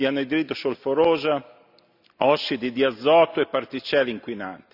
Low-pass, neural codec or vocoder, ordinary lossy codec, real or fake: 5.4 kHz; none; none; real